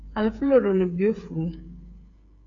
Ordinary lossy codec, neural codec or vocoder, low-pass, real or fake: AAC, 64 kbps; codec, 16 kHz, 8 kbps, FreqCodec, smaller model; 7.2 kHz; fake